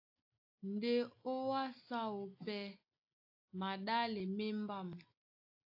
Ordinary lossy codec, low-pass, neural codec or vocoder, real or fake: AAC, 32 kbps; 5.4 kHz; none; real